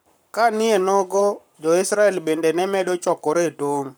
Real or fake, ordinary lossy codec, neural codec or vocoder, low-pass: fake; none; codec, 44.1 kHz, 7.8 kbps, Pupu-Codec; none